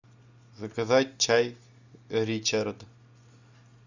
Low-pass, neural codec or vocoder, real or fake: 7.2 kHz; none; real